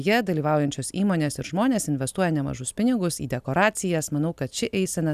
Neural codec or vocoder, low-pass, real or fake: none; 14.4 kHz; real